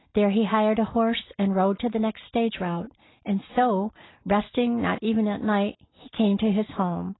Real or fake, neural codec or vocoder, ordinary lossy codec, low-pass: real; none; AAC, 16 kbps; 7.2 kHz